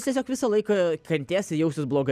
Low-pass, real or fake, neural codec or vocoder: 14.4 kHz; real; none